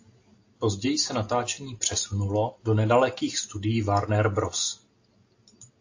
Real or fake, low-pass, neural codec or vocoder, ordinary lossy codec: real; 7.2 kHz; none; AAC, 48 kbps